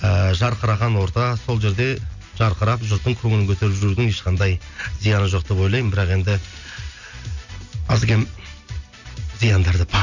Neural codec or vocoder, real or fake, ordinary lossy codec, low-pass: none; real; none; 7.2 kHz